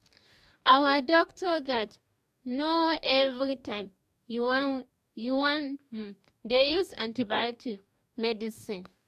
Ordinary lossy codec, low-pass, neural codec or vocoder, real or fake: AAC, 96 kbps; 14.4 kHz; codec, 44.1 kHz, 2.6 kbps, DAC; fake